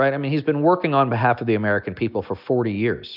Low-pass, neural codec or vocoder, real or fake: 5.4 kHz; none; real